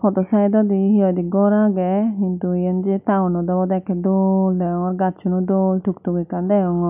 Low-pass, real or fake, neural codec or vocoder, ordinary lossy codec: 3.6 kHz; real; none; none